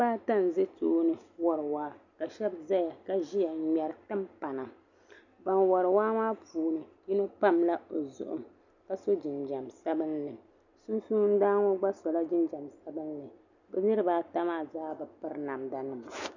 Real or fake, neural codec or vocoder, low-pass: real; none; 7.2 kHz